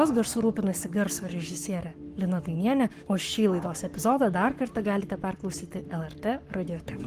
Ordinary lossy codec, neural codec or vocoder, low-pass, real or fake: Opus, 24 kbps; codec, 44.1 kHz, 7.8 kbps, Pupu-Codec; 14.4 kHz; fake